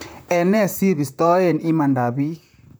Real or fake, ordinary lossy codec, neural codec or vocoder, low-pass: fake; none; vocoder, 44.1 kHz, 128 mel bands, Pupu-Vocoder; none